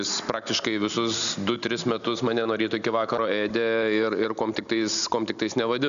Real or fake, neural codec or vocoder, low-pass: real; none; 7.2 kHz